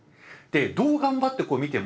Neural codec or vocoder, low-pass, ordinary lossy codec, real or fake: none; none; none; real